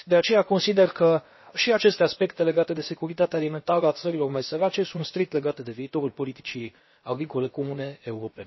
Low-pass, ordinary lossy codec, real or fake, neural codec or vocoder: 7.2 kHz; MP3, 24 kbps; fake; codec, 16 kHz, about 1 kbps, DyCAST, with the encoder's durations